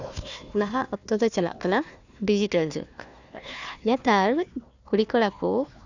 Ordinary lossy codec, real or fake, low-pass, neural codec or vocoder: none; fake; 7.2 kHz; codec, 16 kHz, 1 kbps, FunCodec, trained on Chinese and English, 50 frames a second